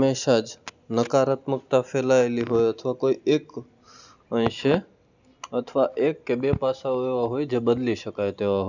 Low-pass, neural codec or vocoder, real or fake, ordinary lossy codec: 7.2 kHz; none; real; none